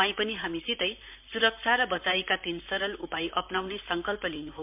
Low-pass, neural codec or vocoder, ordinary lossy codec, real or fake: 3.6 kHz; vocoder, 44.1 kHz, 128 mel bands, Pupu-Vocoder; MP3, 32 kbps; fake